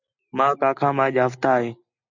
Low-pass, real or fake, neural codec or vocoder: 7.2 kHz; real; none